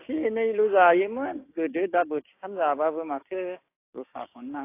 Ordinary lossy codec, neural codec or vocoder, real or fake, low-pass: AAC, 24 kbps; codec, 16 kHz, 6 kbps, DAC; fake; 3.6 kHz